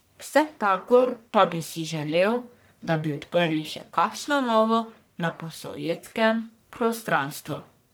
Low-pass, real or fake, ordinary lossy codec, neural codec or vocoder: none; fake; none; codec, 44.1 kHz, 1.7 kbps, Pupu-Codec